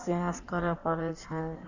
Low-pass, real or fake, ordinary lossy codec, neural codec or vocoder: 7.2 kHz; fake; Opus, 64 kbps; codec, 16 kHz in and 24 kHz out, 1.1 kbps, FireRedTTS-2 codec